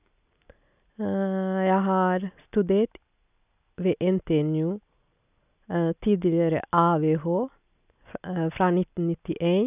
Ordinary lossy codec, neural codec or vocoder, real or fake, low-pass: none; none; real; 3.6 kHz